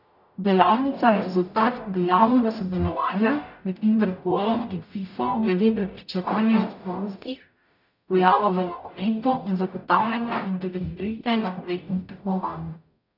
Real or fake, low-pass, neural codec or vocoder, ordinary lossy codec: fake; 5.4 kHz; codec, 44.1 kHz, 0.9 kbps, DAC; none